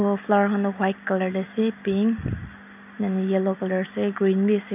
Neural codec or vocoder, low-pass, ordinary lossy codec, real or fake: none; 3.6 kHz; none; real